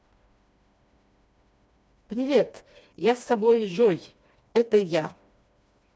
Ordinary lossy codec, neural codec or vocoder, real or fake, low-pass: none; codec, 16 kHz, 2 kbps, FreqCodec, smaller model; fake; none